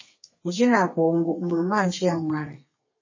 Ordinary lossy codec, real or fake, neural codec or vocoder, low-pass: MP3, 32 kbps; fake; codec, 32 kHz, 1.9 kbps, SNAC; 7.2 kHz